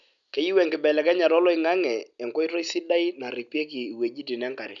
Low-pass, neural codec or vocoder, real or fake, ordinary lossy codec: 7.2 kHz; none; real; none